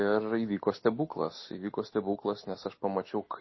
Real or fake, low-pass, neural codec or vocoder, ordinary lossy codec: real; 7.2 kHz; none; MP3, 24 kbps